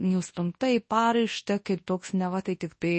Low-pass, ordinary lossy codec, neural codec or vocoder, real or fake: 10.8 kHz; MP3, 32 kbps; codec, 24 kHz, 0.9 kbps, WavTokenizer, large speech release; fake